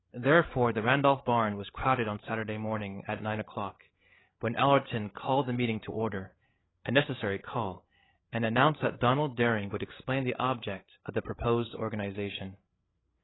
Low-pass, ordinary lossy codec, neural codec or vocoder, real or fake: 7.2 kHz; AAC, 16 kbps; codec, 16 kHz, 8 kbps, FreqCodec, larger model; fake